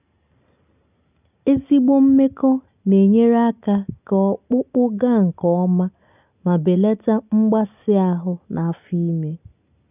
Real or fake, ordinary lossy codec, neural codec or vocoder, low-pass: real; none; none; 3.6 kHz